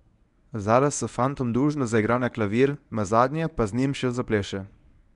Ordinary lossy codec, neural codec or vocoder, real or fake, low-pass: none; codec, 24 kHz, 0.9 kbps, WavTokenizer, medium speech release version 1; fake; 10.8 kHz